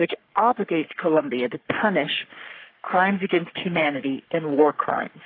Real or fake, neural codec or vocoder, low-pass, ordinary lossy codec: fake; codec, 44.1 kHz, 3.4 kbps, Pupu-Codec; 5.4 kHz; AAC, 32 kbps